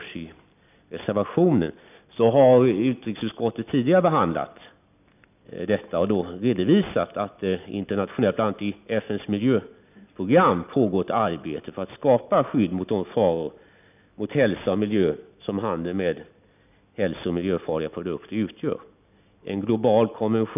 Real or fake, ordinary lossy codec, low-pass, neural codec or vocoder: real; none; 3.6 kHz; none